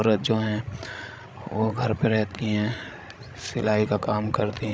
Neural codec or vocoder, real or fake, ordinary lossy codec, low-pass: codec, 16 kHz, 16 kbps, FreqCodec, larger model; fake; none; none